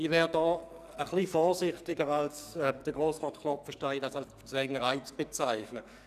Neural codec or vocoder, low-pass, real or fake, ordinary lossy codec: codec, 44.1 kHz, 2.6 kbps, SNAC; 14.4 kHz; fake; none